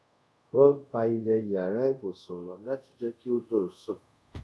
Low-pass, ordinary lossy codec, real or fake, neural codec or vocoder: none; none; fake; codec, 24 kHz, 0.5 kbps, DualCodec